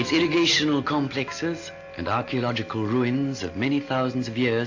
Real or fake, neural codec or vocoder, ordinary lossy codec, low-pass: real; none; AAC, 48 kbps; 7.2 kHz